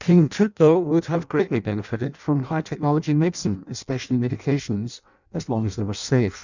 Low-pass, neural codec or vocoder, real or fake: 7.2 kHz; codec, 16 kHz in and 24 kHz out, 0.6 kbps, FireRedTTS-2 codec; fake